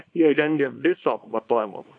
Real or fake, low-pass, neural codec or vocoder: fake; 9.9 kHz; codec, 24 kHz, 0.9 kbps, WavTokenizer, small release